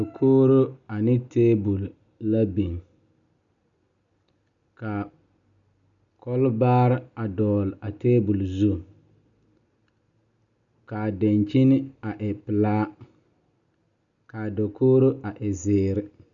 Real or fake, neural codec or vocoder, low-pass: real; none; 7.2 kHz